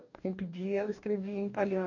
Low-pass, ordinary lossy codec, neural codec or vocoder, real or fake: 7.2 kHz; none; codec, 44.1 kHz, 2.6 kbps, DAC; fake